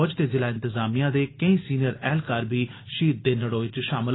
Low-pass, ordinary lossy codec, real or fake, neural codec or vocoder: 7.2 kHz; AAC, 16 kbps; real; none